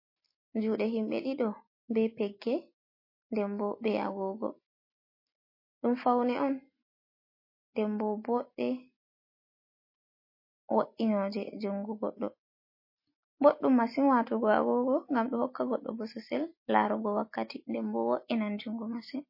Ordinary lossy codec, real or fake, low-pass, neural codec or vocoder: MP3, 24 kbps; real; 5.4 kHz; none